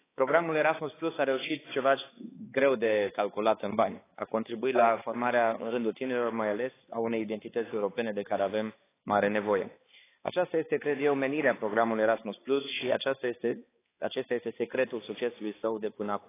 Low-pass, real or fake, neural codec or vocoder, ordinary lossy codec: 3.6 kHz; fake; codec, 16 kHz, 4 kbps, X-Codec, HuBERT features, trained on balanced general audio; AAC, 16 kbps